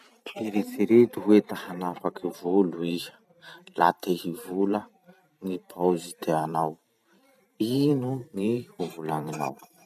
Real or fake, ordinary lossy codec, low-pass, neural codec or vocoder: fake; none; 14.4 kHz; vocoder, 44.1 kHz, 128 mel bands every 512 samples, BigVGAN v2